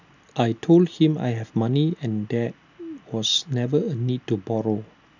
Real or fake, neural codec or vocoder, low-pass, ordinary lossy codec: real; none; 7.2 kHz; none